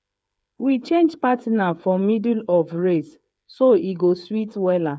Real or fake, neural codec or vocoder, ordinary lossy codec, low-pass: fake; codec, 16 kHz, 8 kbps, FreqCodec, smaller model; none; none